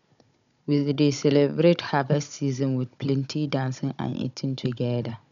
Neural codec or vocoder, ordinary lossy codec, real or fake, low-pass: codec, 16 kHz, 16 kbps, FunCodec, trained on Chinese and English, 50 frames a second; none; fake; 7.2 kHz